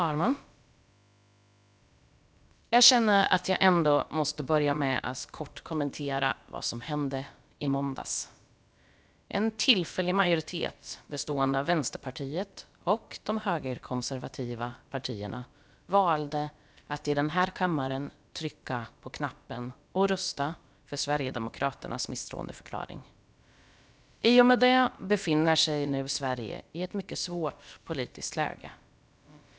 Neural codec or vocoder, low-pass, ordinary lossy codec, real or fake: codec, 16 kHz, about 1 kbps, DyCAST, with the encoder's durations; none; none; fake